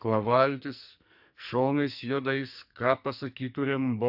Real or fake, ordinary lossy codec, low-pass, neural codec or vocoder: fake; AAC, 48 kbps; 5.4 kHz; codec, 44.1 kHz, 2.6 kbps, SNAC